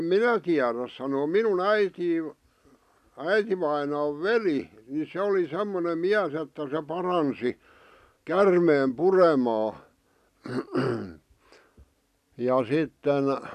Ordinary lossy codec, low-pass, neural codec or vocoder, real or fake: none; 14.4 kHz; none; real